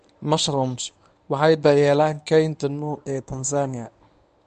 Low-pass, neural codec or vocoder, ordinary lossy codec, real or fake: 10.8 kHz; codec, 24 kHz, 0.9 kbps, WavTokenizer, medium speech release version 1; none; fake